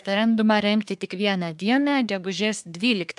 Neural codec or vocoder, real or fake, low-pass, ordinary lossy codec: codec, 24 kHz, 1 kbps, SNAC; fake; 10.8 kHz; MP3, 96 kbps